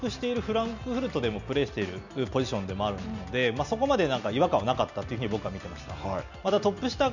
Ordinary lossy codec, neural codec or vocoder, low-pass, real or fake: none; none; 7.2 kHz; real